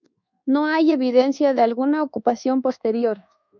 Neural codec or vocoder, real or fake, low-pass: codec, 24 kHz, 1.2 kbps, DualCodec; fake; 7.2 kHz